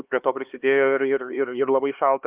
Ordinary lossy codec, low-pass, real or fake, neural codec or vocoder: Opus, 32 kbps; 3.6 kHz; fake; codec, 16 kHz, 4 kbps, X-Codec, HuBERT features, trained on LibriSpeech